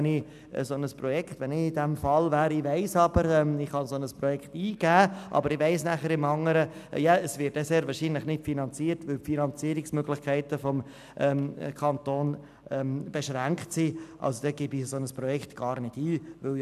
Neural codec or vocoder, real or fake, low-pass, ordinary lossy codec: none; real; 14.4 kHz; none